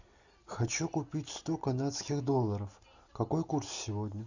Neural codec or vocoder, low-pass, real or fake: none; 7.2 kHz; real